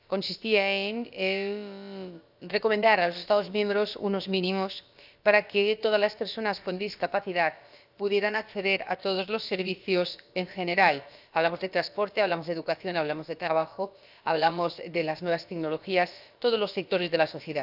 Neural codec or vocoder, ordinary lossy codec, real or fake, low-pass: codec, 16 kHz, about 1 kbps, DyCAST, with the encoder's durations; none; fake; 5.4 kHz